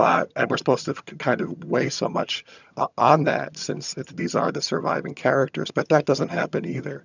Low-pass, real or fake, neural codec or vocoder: 7.2 kHz; fake; vocoder, 22.05 kHz, 80 mel bands, HiFi-GAN